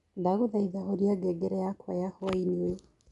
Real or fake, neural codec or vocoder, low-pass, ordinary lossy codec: real; none; 10.8 kHz; none